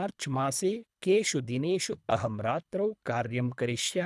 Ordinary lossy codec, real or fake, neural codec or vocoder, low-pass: none; fake; codec, 24 kHz, 3 kbps, HILCodec; none